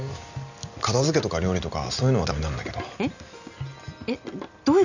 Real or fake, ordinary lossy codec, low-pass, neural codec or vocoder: real; none; 7.2 kHz; none